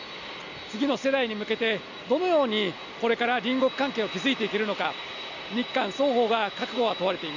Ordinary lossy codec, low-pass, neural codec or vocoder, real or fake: none; 7.2 kHz; none; real